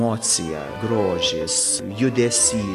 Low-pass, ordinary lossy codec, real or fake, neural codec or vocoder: 14.4 kHz; AAC, 64 kbps; real; none